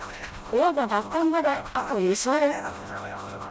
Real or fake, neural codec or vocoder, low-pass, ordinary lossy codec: fake; codec, 16 kHz, 0.5 kbps, FreqCodec, smaller model; none; none